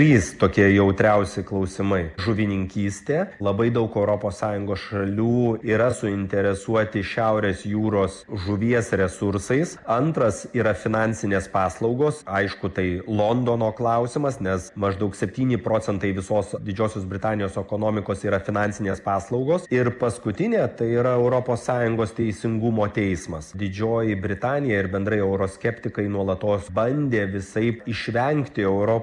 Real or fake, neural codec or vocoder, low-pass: real; none; 10.8 kHz